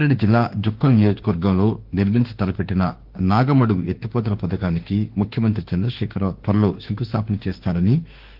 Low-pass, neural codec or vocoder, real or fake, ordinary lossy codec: 5.4 kHz; autoencoder, 48 kHz, 32 numbers a frame, DAC-VAE, trained on Japanese speech; fake; Opus, 16 kbps